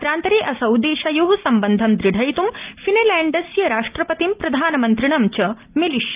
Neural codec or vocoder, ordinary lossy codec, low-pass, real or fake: none; Opus, 24 kbps; 3.6 kHz; real